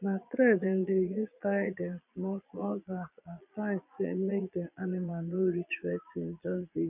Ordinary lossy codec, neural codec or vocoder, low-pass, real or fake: none; vocoder, 22.05 kHz, 80 mel bands, Vocos; 3.6 kHz; fake